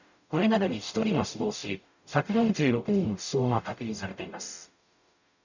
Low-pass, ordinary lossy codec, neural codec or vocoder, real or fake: 7.2 kHz; none; codec, 44.1 kHz, 0.9 kbps, DAC; fake